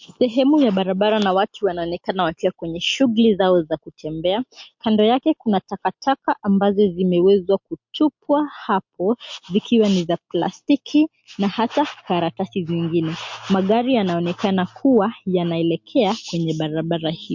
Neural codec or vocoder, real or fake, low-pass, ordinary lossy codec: none; real; 7.2 kHz; MP3, 48 kbps